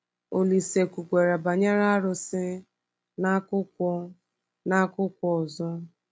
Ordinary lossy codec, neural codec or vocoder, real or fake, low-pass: none; none; real; none